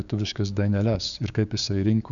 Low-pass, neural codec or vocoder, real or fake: 7.2 kHz; codec, 16 kHz, 6 kbps, DAC; fake